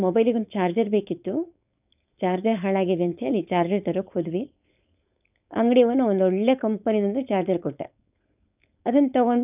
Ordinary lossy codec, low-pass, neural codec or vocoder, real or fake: none; 3.6 kHz; codec, 16 kHz, 4.8 kbps, FACodec; fake